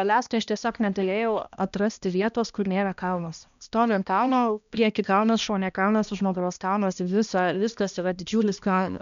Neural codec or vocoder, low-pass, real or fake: codec, 16 kHz, 1 kbps, X-Codec, HuBERT features, trained on balanced general audio; 7.2 kHz; fake